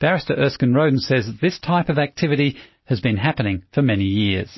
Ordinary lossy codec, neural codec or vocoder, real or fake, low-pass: MP3, 24 kbps; none; real; 7.2 kHz